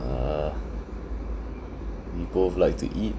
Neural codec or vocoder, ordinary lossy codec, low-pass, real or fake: none; none; none; real